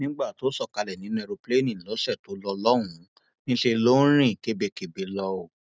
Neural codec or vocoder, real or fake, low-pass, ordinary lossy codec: none; real; none; none